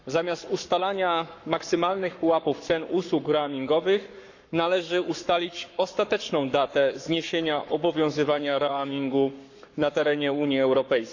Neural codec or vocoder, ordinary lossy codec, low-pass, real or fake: codec, 44.1 kHz, 7.8 kbps, Pupu-Codec; none; 7.2 kHz; fake